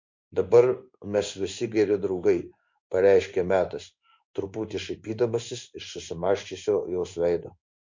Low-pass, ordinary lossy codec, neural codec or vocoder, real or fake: 7.2 kHz; MP3, 48 kbps; codec, 16 kHz in and 24 kHz out, 1 kbps, XY-Tokenizer; fake